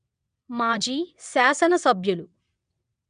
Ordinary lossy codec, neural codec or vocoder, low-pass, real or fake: none; vocoder, 22.05 kHz, 80 mel bands, WaveNeXt; 9.9 kHz; fake